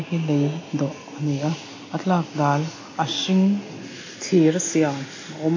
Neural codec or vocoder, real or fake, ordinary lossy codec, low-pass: none; real; AAC, 32 kbps; 7.2 kHz